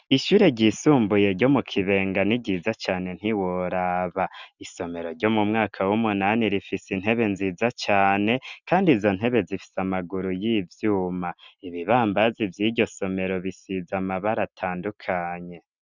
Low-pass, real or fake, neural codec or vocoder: 7.2 kHz; real; none